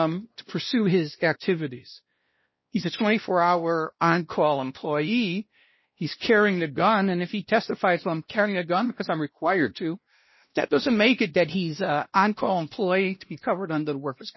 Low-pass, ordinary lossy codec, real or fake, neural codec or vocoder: 7.2 kHz; MP3, 24 kbps; fake; codec, 16 kHz, 1 kbps, X-Codec, WavLM features, trained on Multilingual LibriSpeech